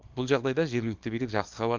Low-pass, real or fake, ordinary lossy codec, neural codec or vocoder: 7.2 kHz; fake; Opus, 24 kbps; codec, 24 kHz, 0.9 kbps, WavTokenizer, small release